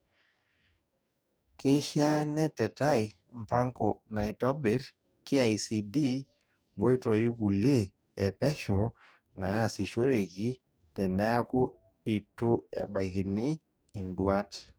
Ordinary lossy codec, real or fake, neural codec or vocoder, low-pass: none; fake; codec, 44.1 kHz, 2.6 kbps, DAC; none